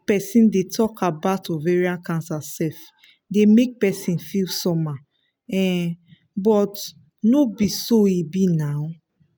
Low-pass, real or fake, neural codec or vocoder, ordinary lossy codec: none; real; none; none